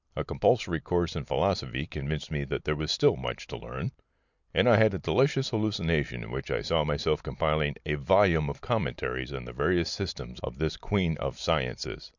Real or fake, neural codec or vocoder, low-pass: real; none; 7.2 kHz